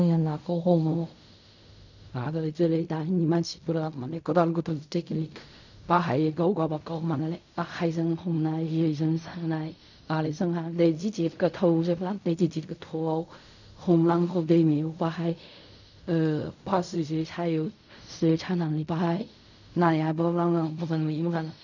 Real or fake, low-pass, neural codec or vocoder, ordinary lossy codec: fake; 7.2 kHz; codec, 16 kHz in and 24 kHz out, 0.4 kbps, LongCat-Audio-Codec, fine tuned four codebook decoder; none